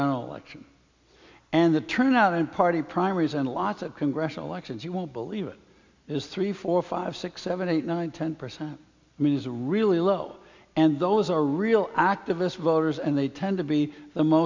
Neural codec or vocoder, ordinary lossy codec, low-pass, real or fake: none; MP3, 64 kbps; 7.2 kHz; real